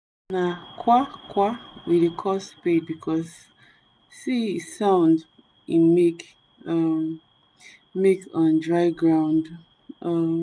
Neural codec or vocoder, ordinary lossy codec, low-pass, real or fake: none; none; none; real